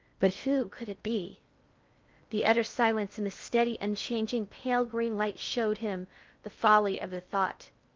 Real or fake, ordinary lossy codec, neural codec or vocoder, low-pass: fake; Opus, 24 kbps; codec, 16 kHz in and 24 kHz out, 0.6 kbps, FocalCodec, streaming, 4096 codes; 7.2 kHz